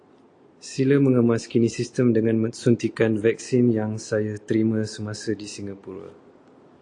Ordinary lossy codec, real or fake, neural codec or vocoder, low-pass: AAC, 48 kbps; real; none; 9.9 kHz